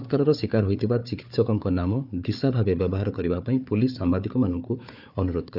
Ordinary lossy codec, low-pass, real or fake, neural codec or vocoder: none; 5.4 kHz; fake; codec, 16 kHz, 4 kbps, FunCodec, trained on Chinese and English, 50 frames a second